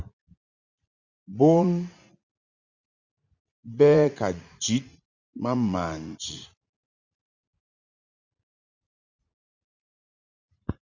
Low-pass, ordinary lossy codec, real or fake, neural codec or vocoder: 7.2 kHz; Opus, 64 kbps; fake; vocoder, 44.1 kHz, 80 mel bands, Vocos